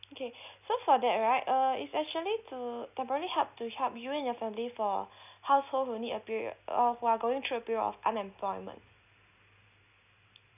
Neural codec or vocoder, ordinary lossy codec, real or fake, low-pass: none; none; real; 3.6 kHz